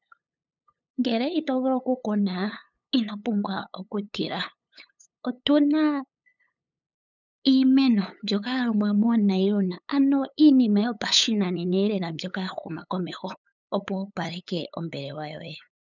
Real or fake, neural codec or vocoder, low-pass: fake; codec, 16 kHz, 8 kbps, FunCodec, trained on LibriTTS, 25 frames a second; 7.2 kHz